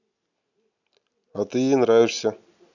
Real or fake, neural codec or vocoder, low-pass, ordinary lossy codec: real; none; 7.2 kHz; none